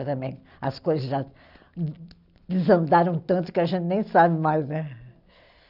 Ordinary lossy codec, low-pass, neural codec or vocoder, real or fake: none; 5.4 kHz; none; real